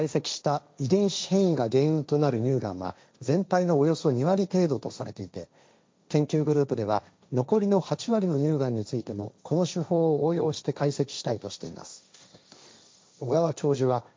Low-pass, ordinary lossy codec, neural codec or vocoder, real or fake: none; none; codec, 16 kHz, 1.1 kbps, Voila-Tokenizer; fake